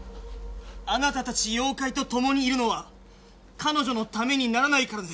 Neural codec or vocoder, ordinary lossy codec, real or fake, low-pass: none; none; real; none